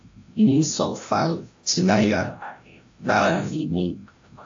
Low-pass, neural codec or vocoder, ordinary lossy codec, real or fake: 7.2 kHz; codec, 16 kHz, 0.5 kbps, FreqCodec, larger model; AAC, 48 kbps; fake